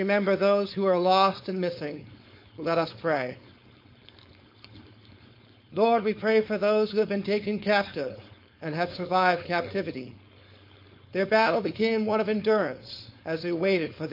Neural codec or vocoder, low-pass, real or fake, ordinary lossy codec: codec, 16 kHz, 4.8 kbps, FACodec; 5.4 kHz; fake; MP3, 32 kbps